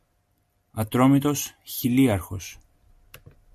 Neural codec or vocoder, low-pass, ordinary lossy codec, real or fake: none; 14.4 kHz; MP3, 64 kbps; real